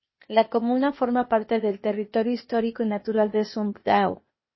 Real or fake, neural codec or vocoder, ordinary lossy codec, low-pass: fake; codec, 16 kHz, 0.8 kbps, ZipCodec; MP3, 24 kbps; 7.2 kHz